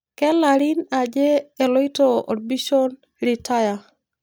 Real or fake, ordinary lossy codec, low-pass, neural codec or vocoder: real; none; none; none